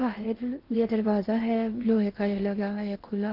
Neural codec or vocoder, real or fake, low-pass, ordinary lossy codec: codec, 16 kHz in and 24 kHz out, 0.8 kbps, FocalCodec, streaming, 65536 codes; fake; 5.4 kHz; Opus, 16 kbps